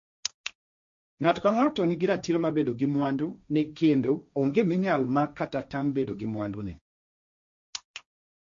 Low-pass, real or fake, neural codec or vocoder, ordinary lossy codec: 7.2 kHz; fake; codec, 16 kHz, 1.1 kbps, Voila-Tokenizer; MP3, 48 kbps